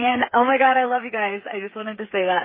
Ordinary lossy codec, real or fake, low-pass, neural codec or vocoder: MP3, 24 kbps; fake; 5.4 kHz; codec, 16 kHz, 16 kbps, FreqCodec, smaller model